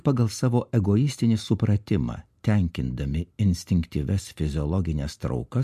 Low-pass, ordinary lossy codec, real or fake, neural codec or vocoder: 14.4 kHz; MP3, 64 kbps; real; none